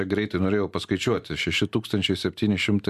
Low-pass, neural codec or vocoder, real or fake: 14.4 kHz; none; real